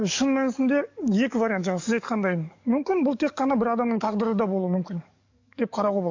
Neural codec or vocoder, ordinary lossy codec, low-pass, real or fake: codec, 44.1 kHz, 7.8 kbps, DAC; AAC, 48 kbps; 7.2 kHz; fake